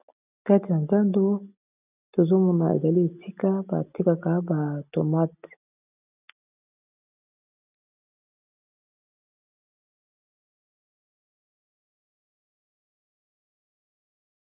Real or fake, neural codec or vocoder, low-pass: real; none; 3.6 kHz